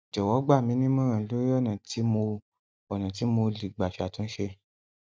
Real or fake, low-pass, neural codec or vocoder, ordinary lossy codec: real; none; none; none